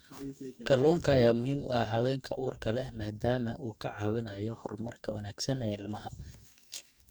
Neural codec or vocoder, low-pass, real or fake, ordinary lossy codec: codec, 44.1 kHz, 2.6 kbps, DAC; none; fake; none